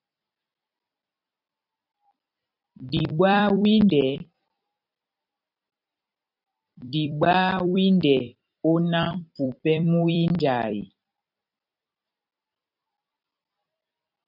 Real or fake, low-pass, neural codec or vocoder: fake; 5.4 kHz; vocoder, 44.1 kHz, 128 mel bands every 256 samples, BigVGAN v2